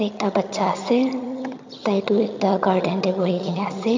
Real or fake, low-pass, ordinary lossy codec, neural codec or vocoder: fake; 7.2 kHz; MP3, 48 kbps; vocoder, 22.05 kHz, 80 mel bands, HiFi-GAN